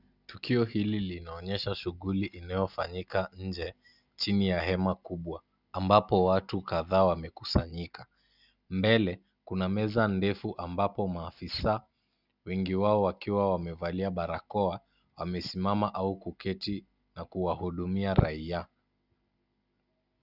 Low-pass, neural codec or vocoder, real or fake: 5.4 kHz; none; real